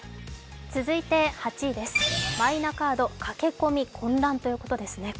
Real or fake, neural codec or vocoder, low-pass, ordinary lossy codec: real; none; none; none